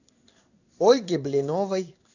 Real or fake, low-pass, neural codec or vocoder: fake; 7.2 kHz; codec, 16 kHz in and 24 kHz out, 1 kbps, XY-Tokenizer